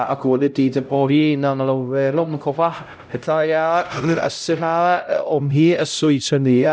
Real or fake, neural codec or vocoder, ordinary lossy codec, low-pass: fake; codec, 16 kHz, 0.5 kbps, X-Codec, HuBERT features, trained on LibriSpeech; none; none